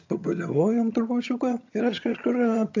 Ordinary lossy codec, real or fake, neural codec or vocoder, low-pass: AAC, 48 kbps; fake; vocoder, 22.05 kHz, 80 mel bands, HiFi-GAN; 7.2 kHz